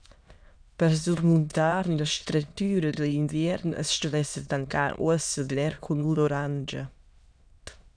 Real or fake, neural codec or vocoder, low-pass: fake; autoencoder, 22.05 kHz, a latent of 192 numbers a frame, VITS, trained on many speakers; 9.9 kHz